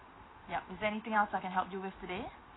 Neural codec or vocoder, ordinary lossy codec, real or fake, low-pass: none; AAC, 16 kbps; real; 7.2 kHz